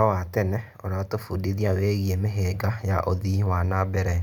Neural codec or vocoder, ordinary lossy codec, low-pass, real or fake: none; none; 19.8 kHz; real